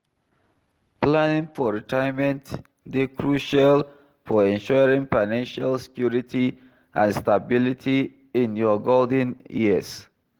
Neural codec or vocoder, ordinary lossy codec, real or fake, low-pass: none; Opus, 16 kbps; real; 19.8 kHz